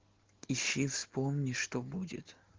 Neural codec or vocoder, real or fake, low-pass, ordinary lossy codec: none; real; 7.2 kHz; Opus, 16 kbps